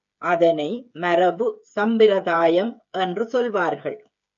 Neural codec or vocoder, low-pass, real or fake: codec, 16 kHz, 8 kbps, FreqCodec, smaller model; 7.2 kHz; fake